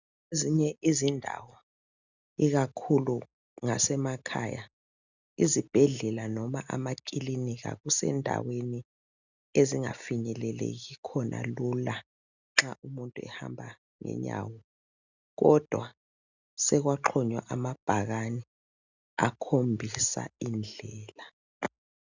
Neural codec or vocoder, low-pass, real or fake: none; 7.2 kHz; real